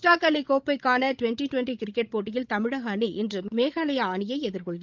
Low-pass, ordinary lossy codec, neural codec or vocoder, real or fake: 7.2 kHz; Opus, 24 kbps; codec, 16 kHz, 16 kbps, FreqCodec, larger model; fake